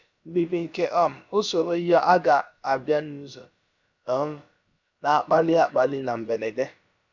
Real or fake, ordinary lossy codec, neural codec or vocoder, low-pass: fake; none; codec, 16 kHz, about 1 kbps, DyCAST, with the encoder's durations; 7.2 kHz